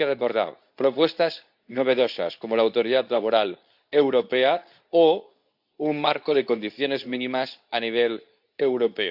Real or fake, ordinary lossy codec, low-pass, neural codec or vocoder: fake; AAC, 48 kbps; 5.4 kHz; codec, 24 kHz, 0.9 kbps, WavTokenizer, medium speech release version 2